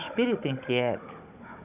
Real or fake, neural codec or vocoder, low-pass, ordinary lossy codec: fake; codec, 16 kHz, 16 kbps, FunCodec, trained on Chinese and English, 50 frames a second; 3.6 kHz; none